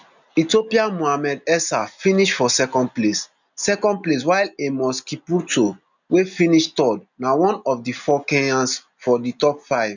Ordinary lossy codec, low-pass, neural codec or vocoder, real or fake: none; 7.2 kHz; none; real